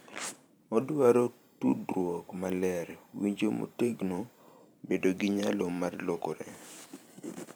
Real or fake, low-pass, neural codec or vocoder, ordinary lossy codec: fake; none; vocoder, 44.1 kHz, 128 mel bands every 512 samples, BigVGAN v2; none